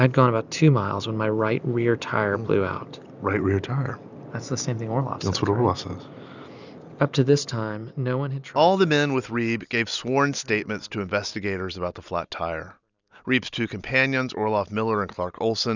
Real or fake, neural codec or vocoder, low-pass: real; none; 7.2 kHz